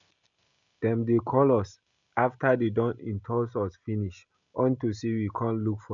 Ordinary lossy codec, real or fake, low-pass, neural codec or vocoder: none; real; 7.2 kHz; none